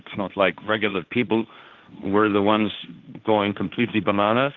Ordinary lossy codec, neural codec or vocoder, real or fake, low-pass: Opus, 32 kbps; codec, 16 kHz, 1.1 kbps, Voila-Tokenizer; fake; 7.2 kHz